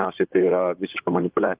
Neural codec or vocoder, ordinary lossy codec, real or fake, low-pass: vocoder, 44.1 kHz, 128 mel bands, Pupu-Vocoder; Opus, 32 kbps; fake; 3.6 kHz